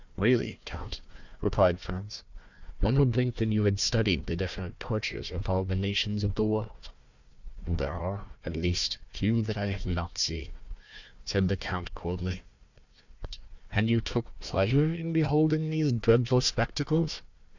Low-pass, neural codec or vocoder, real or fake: 7.2 kHz; codec, 16 kHz, 1 kbps, FunCodec, trained on Chinese and English, 50 frames a second; fake